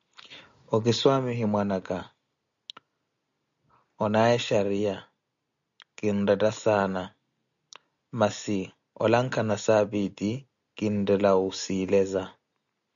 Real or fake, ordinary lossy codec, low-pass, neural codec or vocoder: real; MP3, 96 kbps; 7.2 kHz; none